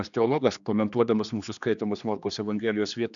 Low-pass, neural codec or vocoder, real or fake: 7.2 kHz; codec, 16 kHz, 2 kbps, X-Codec, HuBERT features, trained on general audio; fake